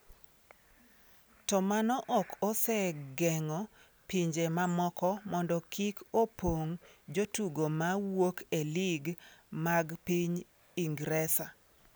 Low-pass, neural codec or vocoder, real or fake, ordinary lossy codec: none; none; real; none